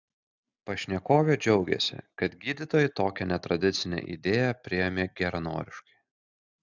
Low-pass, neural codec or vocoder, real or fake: 7.2 kHz; none; real